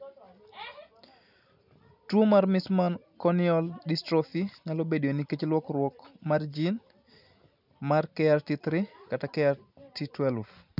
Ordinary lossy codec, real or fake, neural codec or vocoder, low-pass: none; real; none; 5.4 kHz